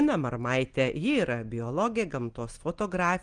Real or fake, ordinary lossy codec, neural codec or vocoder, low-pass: real; Opus, 24 kbps; none; 9.9 kHz